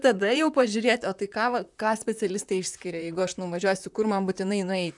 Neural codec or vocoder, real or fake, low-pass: vocoder, 44.1 kHz, 128 mel bands, Pupu-Vocoder; fake; 10.8 kHz